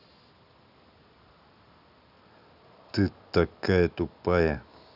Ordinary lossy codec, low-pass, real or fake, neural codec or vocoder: none; 5.4 kHz; real; none